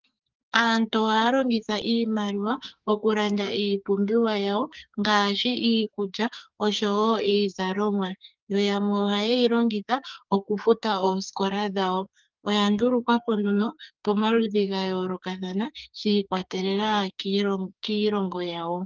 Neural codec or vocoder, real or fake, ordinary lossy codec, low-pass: codec, 44.1 kHz, 2.6 kbps, SNAC; fake; Opus, 24 kbps; 7.2 kHz